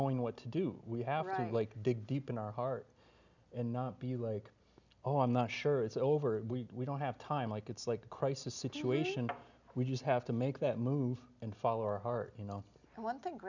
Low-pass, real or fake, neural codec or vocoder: 7.2 kHz; real; none